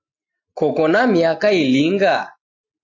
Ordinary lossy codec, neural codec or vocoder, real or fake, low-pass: AAC, 48 kbps; none; real; 7.2 kHz